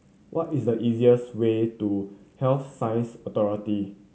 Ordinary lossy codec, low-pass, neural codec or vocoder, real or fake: none; none; none; real